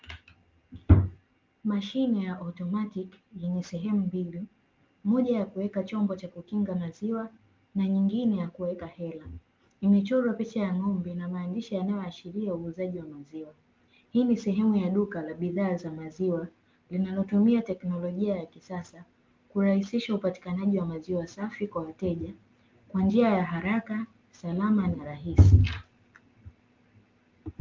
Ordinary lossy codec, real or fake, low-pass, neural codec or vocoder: Opus, 32 kbps; real; 7.2 kHz; none